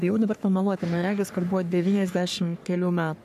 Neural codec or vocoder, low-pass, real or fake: codec, 44.1 kHz, 3.4 kbps, Pupu-Codec; 14.4 kHz; fake